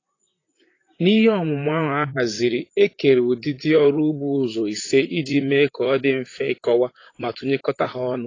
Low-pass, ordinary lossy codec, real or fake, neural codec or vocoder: 7.2 kHz; AAC, 32 kbps; fake; vocoder, 44.1 kHz, 128 mel bands, Pupu-Vocoder